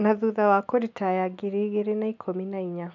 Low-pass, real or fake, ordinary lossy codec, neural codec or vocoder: 7.2 kHz; real; none; none